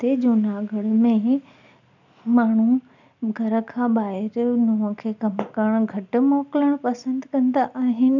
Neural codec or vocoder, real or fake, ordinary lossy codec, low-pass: none; real; none; 7.2 kHz